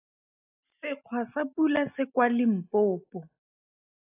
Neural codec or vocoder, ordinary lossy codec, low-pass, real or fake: none; AAC, 24 kbps; 3.6 kHz; real